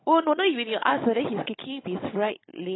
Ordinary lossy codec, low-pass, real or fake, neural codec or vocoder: AAC, 16 kbps; 7.2 kHz; fake; codec, 16 kHz, 16 kbps, FunCodec, trained on Chinese and English, 50 frames a second